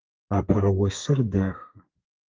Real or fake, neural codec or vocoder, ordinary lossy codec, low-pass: fake; codec, 32 kHz, 1.9 kbps, SNAC; Opus, 32 kbps; 7.2 kHz